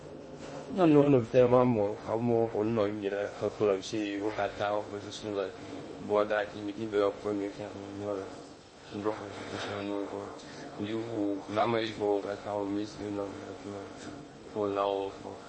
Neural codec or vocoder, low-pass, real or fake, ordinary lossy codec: codec, 16 kHz in and 24 kHz out, 0.6 kbps, FocalCodec, streaming, 2048 codes; 9.9 kHz; fake; MP3, 32 kbps